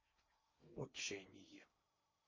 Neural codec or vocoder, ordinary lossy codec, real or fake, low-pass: codec, 16 kHz in and 24 kHz out, 0.8 kbps, FocalCodec, streaming, 65536 codes; MP3, 32 kbps; fake; 7.2 kHz